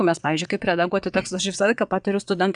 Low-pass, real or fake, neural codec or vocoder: 9.9 kHz; fake; vocoder, 22.05 kHz, 80 mel bands, WaveNeXt